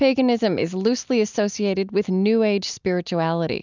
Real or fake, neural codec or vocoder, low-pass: real; none; 7.2 kHz